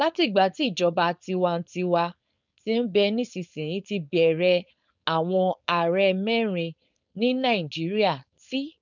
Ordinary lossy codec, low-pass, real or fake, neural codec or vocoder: none; 7.2 kHz; fake; codec, 16 kHz, 4.8 kbps, FACodec